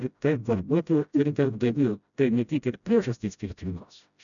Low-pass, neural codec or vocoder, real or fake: 7.2 kHz; codec, 16 kHz, 0.5 kbps, FreqCodec, smaller model; fake